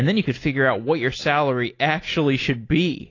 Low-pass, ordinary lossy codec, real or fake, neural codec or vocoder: 7.2 kHz; AAC, 32 kbps; real; none